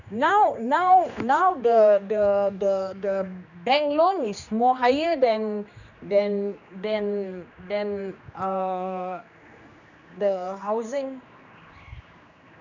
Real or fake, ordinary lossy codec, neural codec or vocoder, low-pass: fake; none; codec, 16 kHz, 2 kbps, X-Codec, HuBERT features, trained on general audio; 7.2 kHz